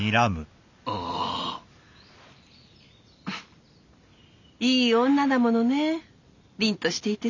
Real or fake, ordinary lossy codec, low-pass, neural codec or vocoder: real; none; 7.2 kHz; none